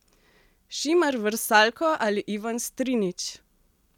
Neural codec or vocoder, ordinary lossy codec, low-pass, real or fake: none; none; 19.8 kHz; real